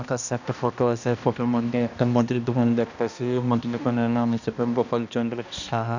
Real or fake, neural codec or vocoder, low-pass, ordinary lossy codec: fake; codec, 16 kHz, 1 kbps, X-Codec, HuBERT features, trained on balanced general audio; 7.2 kHz; none